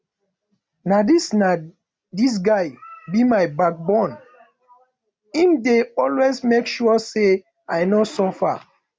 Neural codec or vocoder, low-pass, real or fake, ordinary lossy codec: none; none; real; none